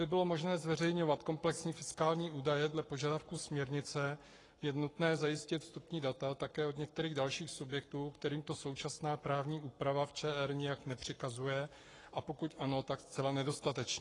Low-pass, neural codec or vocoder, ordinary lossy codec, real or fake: 10.8 kHz; codec, 44.1 kHz, 7.8 kbps, Pupu-Codec; AAC, 32 kbps; fake